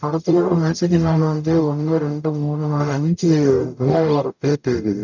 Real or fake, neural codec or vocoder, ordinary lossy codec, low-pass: fake; codec, 44.1 kHz, 0.9 kbps, DAC; none; 7.2 kHz